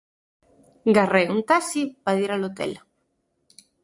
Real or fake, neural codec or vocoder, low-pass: real; none; 10.8 kHz